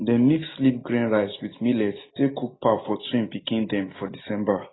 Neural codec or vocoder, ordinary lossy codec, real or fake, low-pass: none; AAC, 16 kbps; real; 7.2 kHz